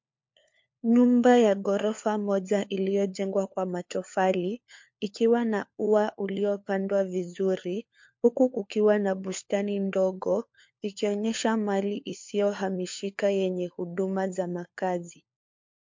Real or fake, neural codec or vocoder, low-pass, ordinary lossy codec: fake; codec, 16 kHz, 4 kbps, FunCodec, trained on LibriTTS, 50 frames a second; 7.2 kHz; MP3, 48 kbps